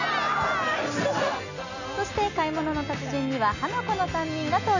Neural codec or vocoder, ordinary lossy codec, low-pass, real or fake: none; none; 7.2 kHz; real